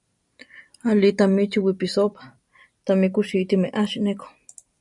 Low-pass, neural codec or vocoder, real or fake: 10.8 kHz; vocoder, 44.1 kHz, 128 mel bands every 256 samples, BigVGAN v2; fake